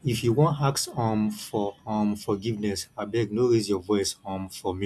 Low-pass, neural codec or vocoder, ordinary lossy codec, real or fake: none; none; none; real